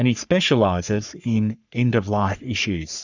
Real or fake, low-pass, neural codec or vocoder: fake; 7.2 kHz; codec, 44.1 kHz, 3.4 kbps, Pupu-Codec